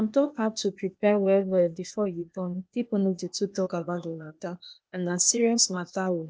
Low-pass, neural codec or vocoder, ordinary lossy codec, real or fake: none; codec, 16 kHz, 0.8 kbps, ZipCodec; none; fake